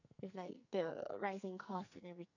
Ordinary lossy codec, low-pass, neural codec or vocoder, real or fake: none; 7.2 kHz; codec, 44.1 kHz, 2.6 kbps, SNAC; fake